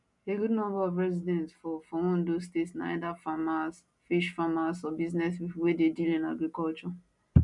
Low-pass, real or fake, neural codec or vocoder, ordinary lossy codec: 10.8 kHz; real; none; none